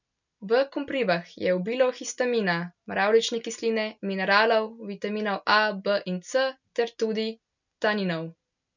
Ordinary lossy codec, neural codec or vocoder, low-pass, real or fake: none; none; 7.2 kHz; real